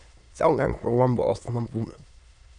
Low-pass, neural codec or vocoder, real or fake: 9.9 kHz; autoencoder, 22.05 kHz, a latent of 192 numbers a frame, VITS, trained on many speakers; fake